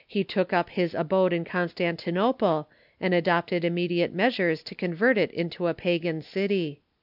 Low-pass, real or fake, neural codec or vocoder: 5.4 kHz; real; none